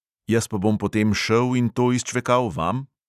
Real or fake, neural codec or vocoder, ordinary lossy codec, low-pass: real; none; none; 14.4 kHz